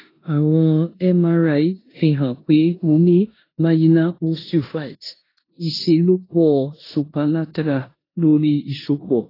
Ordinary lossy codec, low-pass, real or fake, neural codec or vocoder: AAC, 24 kbps; 5.4 kHz; fake; codec, 16 kHz in and 24 kHz out, 0.9 kbps, LongCat-Audio-Codec, four codebook decoder